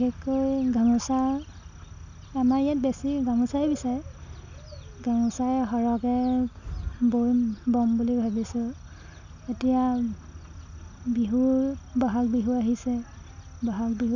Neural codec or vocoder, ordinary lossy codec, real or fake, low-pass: none; none; real; 7.2 kHz